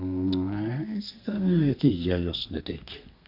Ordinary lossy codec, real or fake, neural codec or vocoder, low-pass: none; fake; codec, 32 kHz, 1.9 kbps, SNAC; 5.4 kHz